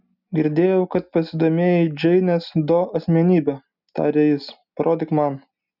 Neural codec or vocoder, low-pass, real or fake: none; 5.4 kHz; real